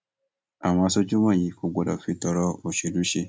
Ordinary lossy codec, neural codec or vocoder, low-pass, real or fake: none; none; none; real